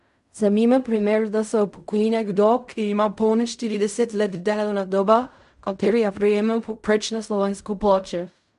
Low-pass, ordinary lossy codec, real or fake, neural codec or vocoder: 10.8 kHz; none; fake; codec, 16 kHz in and 24 kHz out, 0.4 kbps, LongCat-Audio-Codec, fine tuned four codebook decoder